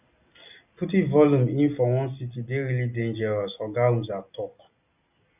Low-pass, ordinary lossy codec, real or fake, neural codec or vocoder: 3.6 kHz; none; real; none